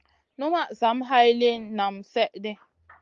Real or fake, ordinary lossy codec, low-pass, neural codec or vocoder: real; Opus, 32 kbps; 7.2 kHz; none